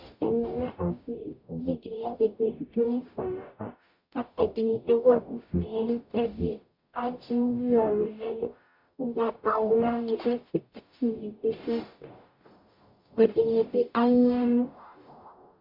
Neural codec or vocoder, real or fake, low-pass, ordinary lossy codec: codec, 44.1 kHz, 0.9 kbps, DAC; fake; 5.4 kHz; MP3, 48 kbps